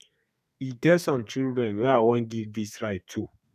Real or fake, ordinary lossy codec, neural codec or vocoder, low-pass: fake; none; codec, 32 kHz, 1.9 kbps, SNAC; 14.4 kHz